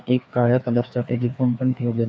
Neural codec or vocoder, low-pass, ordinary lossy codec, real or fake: codec, 16 kHz, 2 kbps, FreqCodec, larger model; none; none; fake